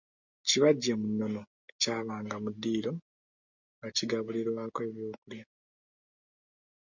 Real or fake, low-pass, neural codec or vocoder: real; 7.2 kHz; none